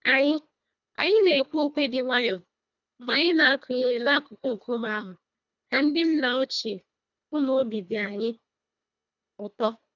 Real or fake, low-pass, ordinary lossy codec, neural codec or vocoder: fake; 7.2 kHz; none; codec, 24 kHz, 1.5 kbps, HILCodec